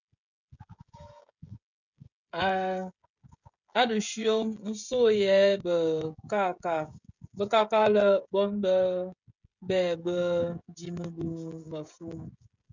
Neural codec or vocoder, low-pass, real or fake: codec, 16 kHz, 16 kbps, FreqCodec, smaller model; 7.2 kHz; fake